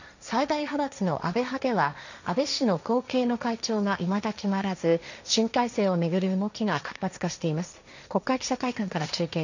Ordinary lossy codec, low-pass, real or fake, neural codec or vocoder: none; 7.2 kHz; fake; codec, 16 kHz, 1.1 kbps, Voila-Tokenizer